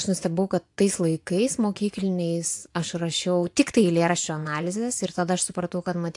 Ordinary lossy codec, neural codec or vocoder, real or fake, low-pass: AAC, 64 kbps; vocoder, 24 kHz, 100 mel bands, Vocos; fake; 10.8 kHz